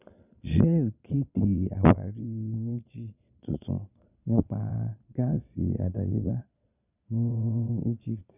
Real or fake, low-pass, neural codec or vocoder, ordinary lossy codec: fake; 3.6 kHz; vocoder, 22.05 kHz, 80 mel bands, Vocos; none